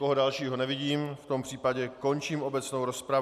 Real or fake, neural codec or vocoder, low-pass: real; none; 14.4 kHz